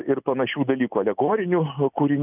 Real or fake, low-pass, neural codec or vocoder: real; 3.6 kHz; none